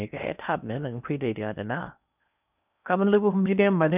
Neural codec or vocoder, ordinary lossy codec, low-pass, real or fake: codec, 16 kHz in and 24 kHz out, 0.8 kbps, FocalCodec, streaming, 65536 codes; none; 3.6 kHz; fake